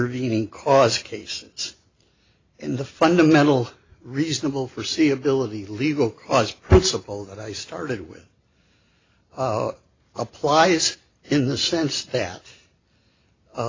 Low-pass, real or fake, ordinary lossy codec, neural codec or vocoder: 7.2 kHz; real; AAC, 32 kbps; none